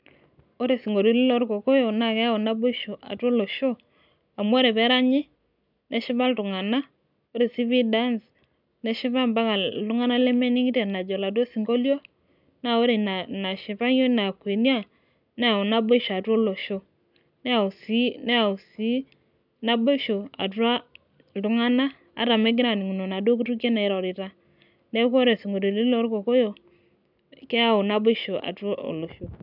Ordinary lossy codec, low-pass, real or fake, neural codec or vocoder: none; 5.4 kHz; real; none